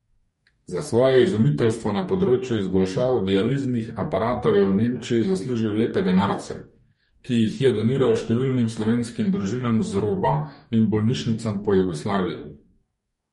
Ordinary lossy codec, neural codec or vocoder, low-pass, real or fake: MP3, 48 kbps; codec, 44.1 kHz, 2.6 kbps, DAC; 19.8 kHz; fake